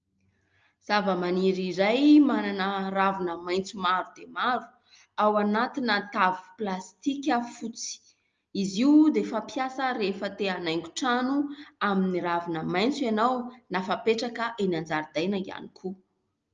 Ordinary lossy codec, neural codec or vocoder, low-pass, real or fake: Opus, 24 kbps; none; 7.2 kHz; real